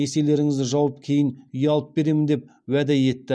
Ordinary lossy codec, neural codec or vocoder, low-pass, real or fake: none; none; none; real